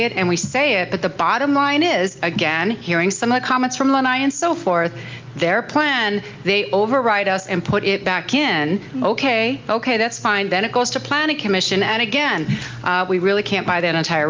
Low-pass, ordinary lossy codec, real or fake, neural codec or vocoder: 7.2 kHz; Opus, 24 kbps; real; none